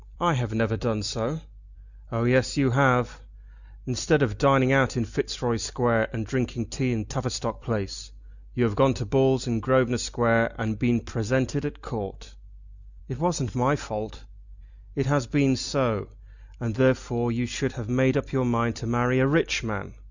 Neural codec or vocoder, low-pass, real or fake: none; 7.2 kHz; real